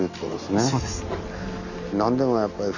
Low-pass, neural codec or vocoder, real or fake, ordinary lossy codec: 7.2 kHz; none; real; none